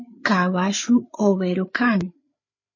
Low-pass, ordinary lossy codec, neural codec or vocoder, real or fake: 7.2 kHz; MP3, 32 kbps; codec, 16 kHz, 8 kbps, FreqCodec, larger model; fake